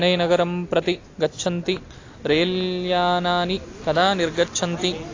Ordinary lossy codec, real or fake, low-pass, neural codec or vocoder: AAC, 32 kbps; real; 7.2 kHz; none